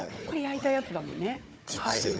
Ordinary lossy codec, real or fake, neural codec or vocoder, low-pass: none; fake; codec, 16 kHz, 4 kbps, FunCodec, trained on Chinese and English, 50 frames a second; none